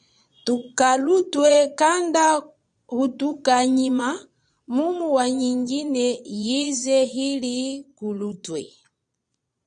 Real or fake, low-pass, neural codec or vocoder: fake; 9.9 kHz; vocoder, 22.05 kHz, 80 mel bands, Vocos